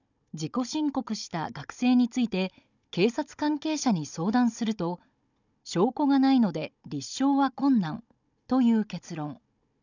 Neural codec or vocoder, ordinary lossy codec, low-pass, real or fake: codec, 16 kHz, 16 kbps, FunCodec, trained on Chinese and English, 50 frames a second; none; 7.2 kHz; fake